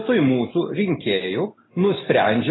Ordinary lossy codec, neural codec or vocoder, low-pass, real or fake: AAC, 16 kbps; none; 7.2 kHz; real